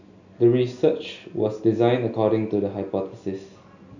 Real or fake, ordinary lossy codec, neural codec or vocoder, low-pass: real; none; none; 7.2 kHz